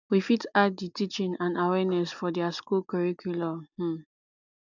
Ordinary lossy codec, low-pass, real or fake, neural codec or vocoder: none; 7.2 kHz; real; none